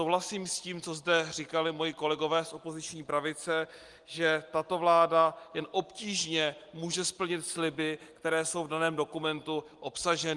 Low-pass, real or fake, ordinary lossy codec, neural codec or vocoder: 10.8 kHz; real; Opus, 24 kbps; none